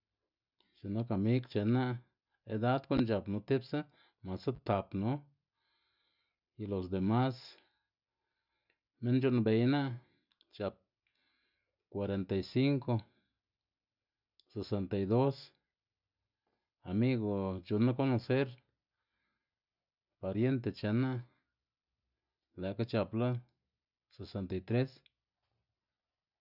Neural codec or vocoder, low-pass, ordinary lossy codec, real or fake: none; 5.4 kHz; none; real